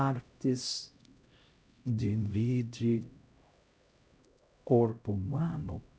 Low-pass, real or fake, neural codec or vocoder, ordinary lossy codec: none; fake; codec, 16 kHz, 0.5 kbps, X-Codec, HuBERT features, trained on LibriSpeech; none